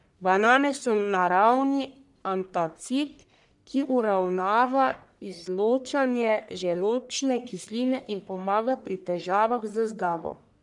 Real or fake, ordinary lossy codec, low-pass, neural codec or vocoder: fake; none; 10.8 kHz; codec, 44.1 kHz, 1.7 kbps, Pupu-Codec